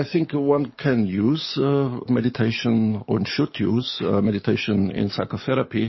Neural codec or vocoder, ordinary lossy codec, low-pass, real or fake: codec, 44.1 kHz, 7.8 kbps, DAC; MP3, 24 kbps; 7.2 kHz; fake